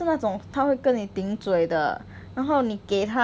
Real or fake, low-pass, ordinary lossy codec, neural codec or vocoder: real; none; none; none